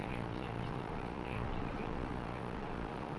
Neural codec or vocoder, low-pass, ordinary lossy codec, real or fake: vocoder, 22.05 kHz, 80 mel bands, Vocos; none; none; fake